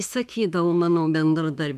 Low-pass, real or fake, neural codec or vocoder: 14.4 kHz; fake; autoencoder, 48 kHz, 32 numbers a frame, DAC-VAE, trained on Japanese speech